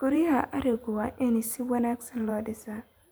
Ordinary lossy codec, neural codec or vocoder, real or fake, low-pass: none; vocoder, 44.1 kHz, 128 mel bands every 512 samples, BigVGAN v2; fake; none